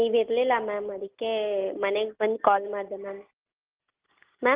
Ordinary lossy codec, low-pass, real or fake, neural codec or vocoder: Opus, 32 kbps; 3.6 kHz; real; none